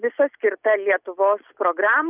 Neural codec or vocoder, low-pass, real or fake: none; 3.6 kHz; real